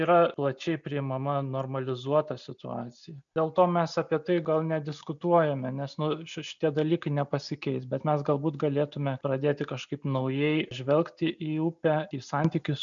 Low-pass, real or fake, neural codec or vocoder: 7.2 kHz; real; none